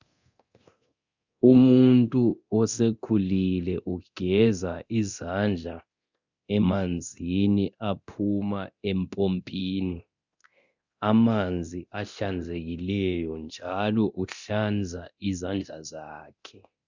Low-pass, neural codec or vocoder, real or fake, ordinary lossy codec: 7.2 kHz; codec, 24 kHz, 0.9 kbps, DualCodec; fake; Opus, 64 kbps